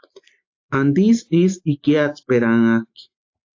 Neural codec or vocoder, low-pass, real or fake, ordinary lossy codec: none; 7.2 kHz; real; AAC, 48 kbps